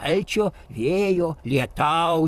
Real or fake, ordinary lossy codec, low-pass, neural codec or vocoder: fake; Opus, 64 kbps; 14.4 kHz; vocoder, 44.1 kHz, 128 mel bands, Pupu-Vocoder